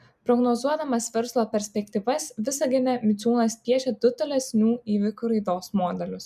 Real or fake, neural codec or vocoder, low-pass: real; none; 14.4 kHz